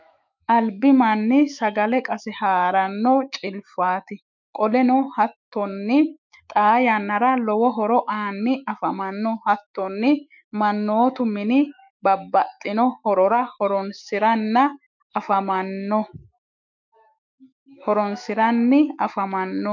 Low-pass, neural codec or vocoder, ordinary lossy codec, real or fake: 7.2 kHz; autoencoder, 48 kHz, 128 numbers a frame, DAC-VAE, trained on Japanese speech; MP3, 64 kbps; fake